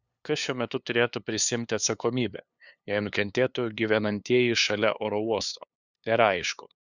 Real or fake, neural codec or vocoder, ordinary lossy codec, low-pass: fake; codec, 16 kHz, 2 kbps, FunCodec, trained on LibriTTS, 25 frames a second; Opus, 64 kbps; 7.2 kHz